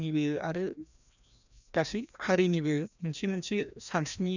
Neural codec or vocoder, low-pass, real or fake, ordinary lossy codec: codec, 16 kHz, 1 kbps, FreqCodec, larger model; 7.2 kHz; fake; none